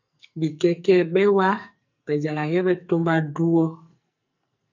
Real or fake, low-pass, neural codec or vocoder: fake; 7.2 kHz; codec, 44.1 kHz, 2.6 kbps, SNAC